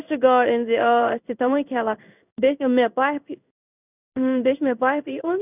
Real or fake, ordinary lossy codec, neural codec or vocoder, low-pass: fake; none; codec, 16 kHz in and 24 kHz out, 1 kbps, XY-Tokenizer; 3.6 kHz